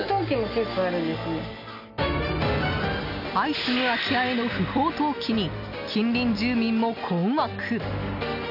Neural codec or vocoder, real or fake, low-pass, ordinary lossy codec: none; real; 5.4 kHz; none